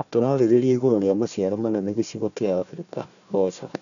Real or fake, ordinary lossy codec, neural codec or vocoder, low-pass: fake; none; codec, 16 kHz, 1 kbps, FunCodec, trained on Chinese and English, 50 frames a second; 7.2 kHz